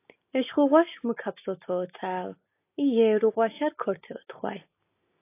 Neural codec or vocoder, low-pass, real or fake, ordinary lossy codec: none; 3.6 kHz; real; AAC, 24 kbps